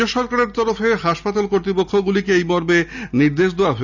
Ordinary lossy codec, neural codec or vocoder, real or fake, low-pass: none; none; real; 7.2 kHz